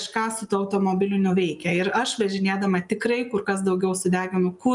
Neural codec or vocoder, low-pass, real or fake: none; 10.8 kHz; real